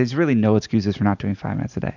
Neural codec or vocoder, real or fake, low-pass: none; real; 7.2 kHz